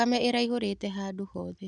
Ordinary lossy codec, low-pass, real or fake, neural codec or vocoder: none; 10.8 kHz; real; none